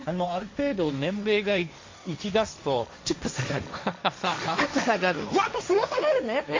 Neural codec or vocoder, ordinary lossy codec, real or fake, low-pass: codec, 16 kHz, 1.1 kbps, Voila-Tokenizer; MP3, 48 kbps; fake; 7.2 kHz